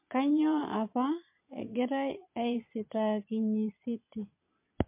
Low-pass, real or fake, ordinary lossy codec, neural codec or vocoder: 3.6 kHz; fake; MP3, 24 kbps; vocoder, 22.05 kHz, 80 mel bands, Vocos